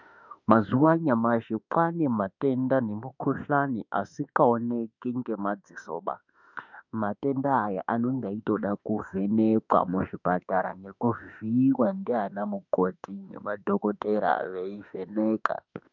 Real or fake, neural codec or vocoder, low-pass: fake; autoencoder, 48 kHz, 32 numbers a frame, DAC-VAE, trained on Japanese speech; 7.2 kHz